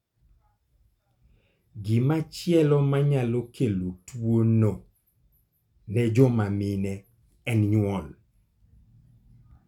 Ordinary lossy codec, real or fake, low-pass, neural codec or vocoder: none; real; 19.8 kHz; none